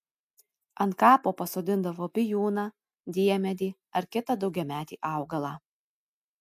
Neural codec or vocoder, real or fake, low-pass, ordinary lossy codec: none; real; 14.4 kHz; MP3, 96 kbps